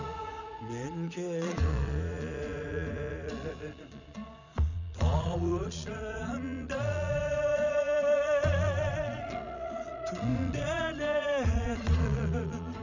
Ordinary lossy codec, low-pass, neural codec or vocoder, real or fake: none; 7.2 kHz; vocoder, 22.05 kHz, 80 mel bands, Vocos; fake